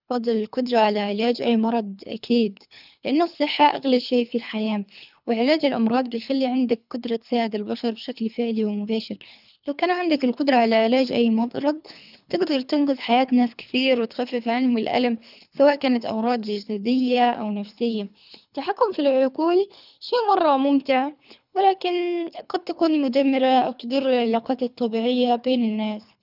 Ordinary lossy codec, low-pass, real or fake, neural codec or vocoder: none; 5.4 kHz; fake; codec, 24 kHz, 3 kbps, HILCodec